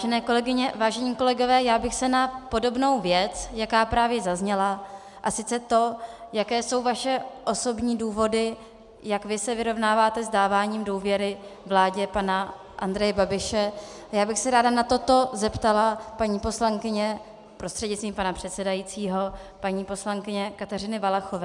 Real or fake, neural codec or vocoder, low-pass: real; none; 10.8 kHz